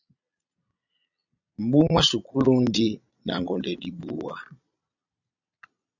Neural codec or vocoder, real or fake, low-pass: vocoder, 22.05 kHz, 80 mel bands, Vocos; fake; 7.2 kHz